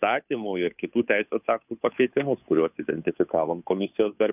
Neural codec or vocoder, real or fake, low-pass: codec, 16 kHz, 2 kbps, FunCodec, trained on Chinese and English, 25 frames a second; fake; 3.6 kHz